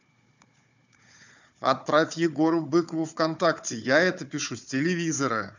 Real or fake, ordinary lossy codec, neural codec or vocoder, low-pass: fake; none; codec, 16 kHz, 4 kbps, FunCodec, trained on Chinese and English, 50 frames a second; 7.2 kHz